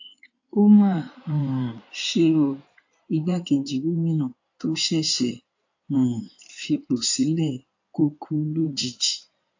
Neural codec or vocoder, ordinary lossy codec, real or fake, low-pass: codec, 16 kHz in and 24 kHz out, 2.2 kbps, FireRedTTS-2 codec; AAC, 48 kbps; fake; 7.2 kHz